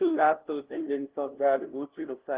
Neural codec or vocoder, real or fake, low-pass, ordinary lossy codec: codec, 16 kHz, 0.5 kbps, FunCodec, trained on LibriTTS, 25 frames a second; fake; 3.6 kHz; Opus, 16 kbps